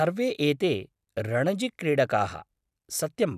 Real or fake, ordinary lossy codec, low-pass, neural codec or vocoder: real; none; 14.4 kHz; none